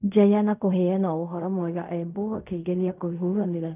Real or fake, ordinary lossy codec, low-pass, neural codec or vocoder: fake; none; 3.6 kHz; codec, 16 kHz in and 24 kHz out, 0.4 kbps, LongCat-Audio-Codec, fine tuned four codebook decoder